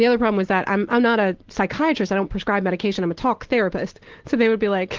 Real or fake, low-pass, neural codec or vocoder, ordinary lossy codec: real; 7.2 kHz; none; Opus, 16 kbps